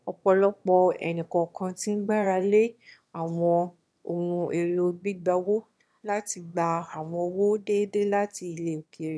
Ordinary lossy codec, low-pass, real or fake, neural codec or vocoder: none; none; fake; autoencoder, 22.05 kHz, a latent of 192 numbers a frame, VITS, trained on one speaker